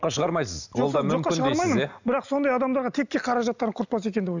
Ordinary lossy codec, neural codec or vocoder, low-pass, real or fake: none; none; 7.2 kHz; real